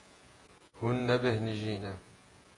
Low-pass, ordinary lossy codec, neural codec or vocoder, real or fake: 10.8 kHz; AAC, 32 kbps; vocoder, 48 kHz, 128 mel bands, Vocos; fake